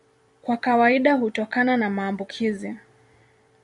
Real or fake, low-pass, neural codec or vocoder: fake; 10.8 kHz; vocoder, 44.1 kHz, 128 mel bands every 256 samples, BigVGAN v2